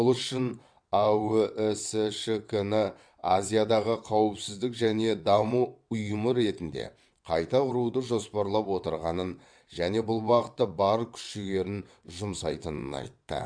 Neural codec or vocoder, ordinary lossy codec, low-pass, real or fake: vocoder, 22.05 kHz, 80 mel bands, WaveNeXt; MP3, 64 kbps; 9.9 kHz; fake